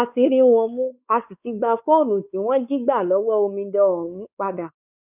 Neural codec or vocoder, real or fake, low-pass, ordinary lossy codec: codec, 16 kHz, 4 kbps, X-Codec, WavLM features, trained on Multilingual LibriSpeech; fake; 3.6 kHz; none